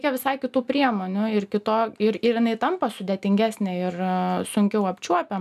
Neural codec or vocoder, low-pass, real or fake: none; 14.4 kHz; real